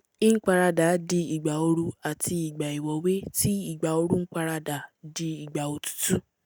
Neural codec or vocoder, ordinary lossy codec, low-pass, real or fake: none; none; none; real